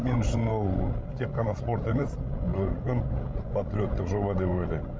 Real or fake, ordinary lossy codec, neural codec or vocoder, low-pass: fake; none; codec, 16 kHz, 16 kbps, FreqCodec, larger model; none